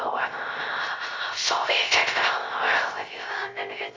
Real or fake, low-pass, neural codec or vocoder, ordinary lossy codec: fake; 7.2 kHz; codec, 16 kHz, 0.3 kbps, FocalCodec; Opus, 32 kbps